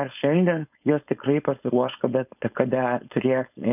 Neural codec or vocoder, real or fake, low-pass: codec, 16 kHz, 4.8 kbps, FACodec; fake; 3.6 kHz